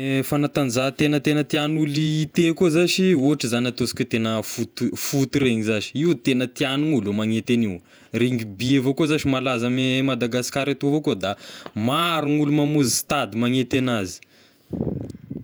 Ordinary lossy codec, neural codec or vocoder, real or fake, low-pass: none; vocoder, 48 kHz, 128 mel bands, Vocos; fake; none